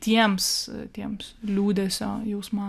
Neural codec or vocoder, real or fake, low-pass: none; real; 14.4 kHz